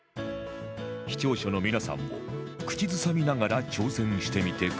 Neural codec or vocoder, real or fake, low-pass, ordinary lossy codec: none; real; none; none